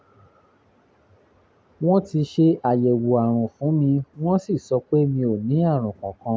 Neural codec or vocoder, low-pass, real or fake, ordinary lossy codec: none; none; real; none